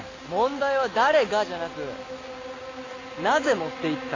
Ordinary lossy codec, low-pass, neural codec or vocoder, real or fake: AAC, 32 kbps; 7.2 kHz; none; real